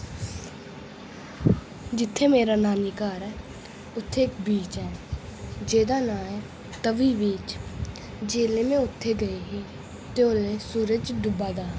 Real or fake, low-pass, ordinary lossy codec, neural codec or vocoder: real; none; none; none